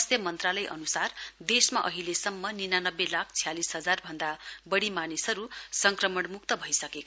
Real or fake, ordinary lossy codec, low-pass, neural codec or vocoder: real; none; none; none